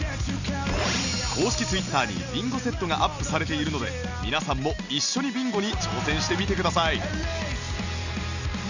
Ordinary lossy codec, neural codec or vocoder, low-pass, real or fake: none; none; 7.2 kHz; real